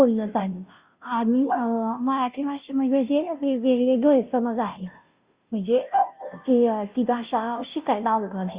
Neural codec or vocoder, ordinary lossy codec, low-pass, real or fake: codec, 16 kHz, 0.5 kbps, FunCodec, trained on Chinese and English, 25 frames a second; Opus, 64 kbps; 3.6 kHz; fake